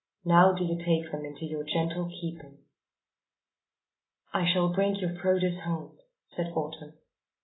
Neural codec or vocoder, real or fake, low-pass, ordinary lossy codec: none; real; 7.2 kHz; AAC, 16 kbps